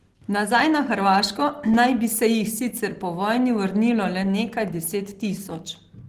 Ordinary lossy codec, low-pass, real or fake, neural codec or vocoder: Opus, 16 kbps; 14.4 kHz; real; none